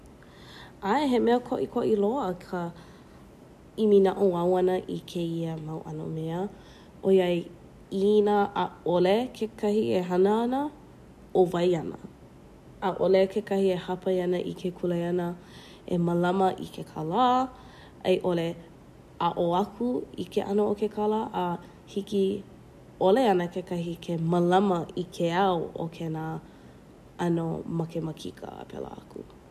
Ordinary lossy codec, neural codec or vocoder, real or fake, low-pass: none; none; real; 14.4 kHz